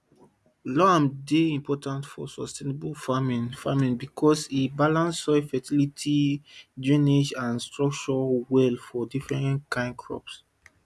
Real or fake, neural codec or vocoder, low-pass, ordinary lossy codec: real; none; none; none